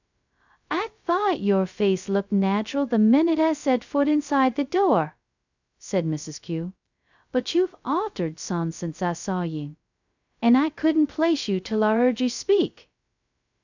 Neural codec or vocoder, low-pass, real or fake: codec, 16 kHz, 0.2 kbps, FocalCodec; 7.2 kHz; fake